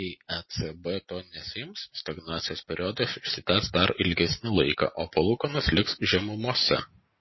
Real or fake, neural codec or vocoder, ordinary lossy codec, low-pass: real; none; MP3, 24 kbps; 7.2 kHz